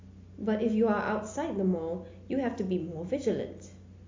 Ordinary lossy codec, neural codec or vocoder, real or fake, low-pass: MP3, 48 kbps; none; real; 7.2 kHz